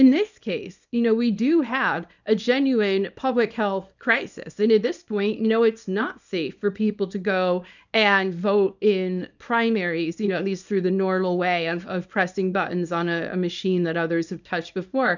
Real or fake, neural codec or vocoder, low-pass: fake; codec, 24 kHz, 0.9 kbps, WavTokenizer, small release; 7.2 kHz